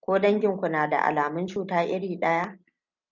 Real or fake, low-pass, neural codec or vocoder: real; 7.2 kHz; none